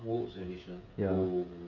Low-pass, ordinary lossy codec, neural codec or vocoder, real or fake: 7.2 kHz; none; codec, 16 kHz, 16 kbps, FreqCodec, smaller model; fake